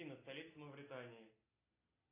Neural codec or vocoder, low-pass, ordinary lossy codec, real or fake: none; 3.6 kHz; AAC, 16 kbps; real